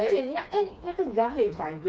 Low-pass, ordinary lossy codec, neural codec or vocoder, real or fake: none; none; codec, 16 kHz, 2 kbps, FreqCodec, smaller model; fake